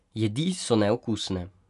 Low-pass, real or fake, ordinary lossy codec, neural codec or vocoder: 10.8 kHz; real; none; none